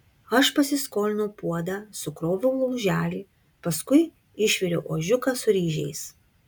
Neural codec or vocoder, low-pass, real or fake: none; 19.8 kHz; real